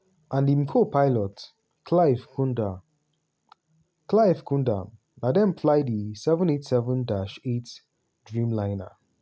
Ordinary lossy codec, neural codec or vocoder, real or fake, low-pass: none; none; real; none